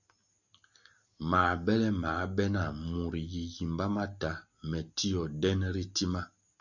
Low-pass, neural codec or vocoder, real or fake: 7.2 kHz; none; real